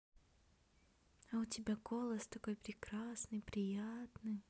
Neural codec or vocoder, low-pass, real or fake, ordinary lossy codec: none; none; real; none